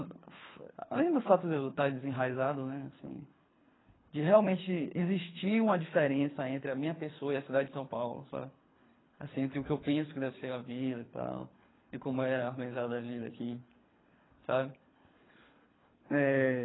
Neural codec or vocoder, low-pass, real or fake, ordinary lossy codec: codec, 24 kHz, 3 kbps, HILCodec; 7.2 kHz; fake; AAC, 16 kbps